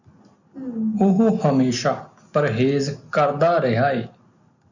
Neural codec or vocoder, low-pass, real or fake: none; 7.2 kHz; real